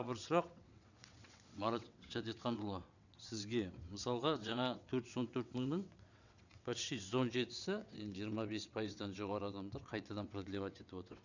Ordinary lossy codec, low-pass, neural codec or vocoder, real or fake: none; 7.2 kHz; vocoder, 22.05 kHz, 80 mel bands, WaveNeXt; fake